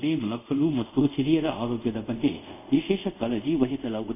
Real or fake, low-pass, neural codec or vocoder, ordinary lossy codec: fake; 3.6 kHz; codec, 24 kHz, 0.5 kbps, DualCodec; none